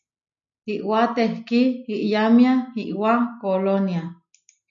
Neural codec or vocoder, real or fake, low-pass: none; real; 7.2 kHz